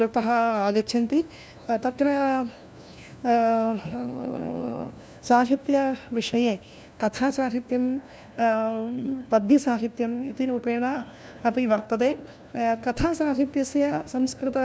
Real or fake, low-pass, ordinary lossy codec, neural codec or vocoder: fake; none; none; codec, 16 kHz, 1 kbps, FunCodec, trained on LibriTTS, 50 frames a second